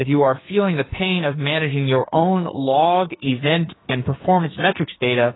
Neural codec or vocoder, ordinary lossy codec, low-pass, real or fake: codec, 44.1 kHz, 2.6 kbps, SNAC; AAC, 16 kbps; 7.2 kHz; fake